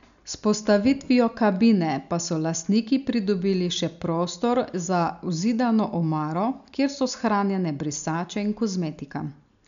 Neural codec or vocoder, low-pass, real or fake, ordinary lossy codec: none; 7.2 kHz; real; MP3, 96 kbps